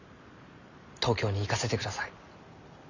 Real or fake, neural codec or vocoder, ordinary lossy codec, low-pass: real; none; none; 7.2 kHz